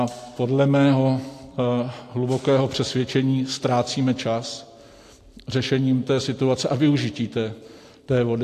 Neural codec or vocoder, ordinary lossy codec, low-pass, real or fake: none; AAC, 64 kbps; 14.4 kHz; real